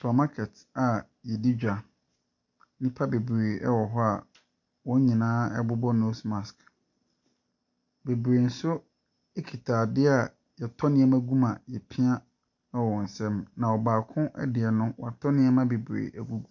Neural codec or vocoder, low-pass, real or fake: none; 7.2 kHz; real